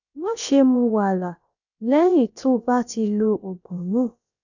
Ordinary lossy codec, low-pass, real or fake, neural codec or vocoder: none; 7.2 kHz; fake; codec, 16 kHz, about 1 kbps, DyCAST, with the encoder's durations